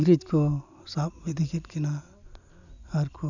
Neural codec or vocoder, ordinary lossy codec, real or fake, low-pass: none; none; real; 7.2 kHz